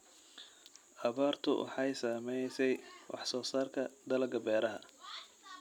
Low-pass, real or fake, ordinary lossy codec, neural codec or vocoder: 19.8 kHz; real; none; none